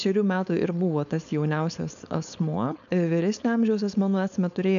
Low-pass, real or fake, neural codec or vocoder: 7.2 kHz; fake; codec, 16 kHz, 4.8 kbps, FACodec